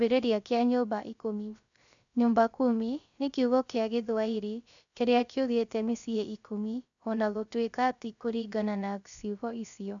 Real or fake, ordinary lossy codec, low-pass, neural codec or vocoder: fake; none; 7.2 kHz; codec, 16 kHz, 0.3 kbps, FocalCodec